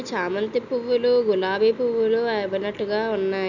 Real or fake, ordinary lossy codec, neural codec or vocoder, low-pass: real; none; none; 7.2 kHz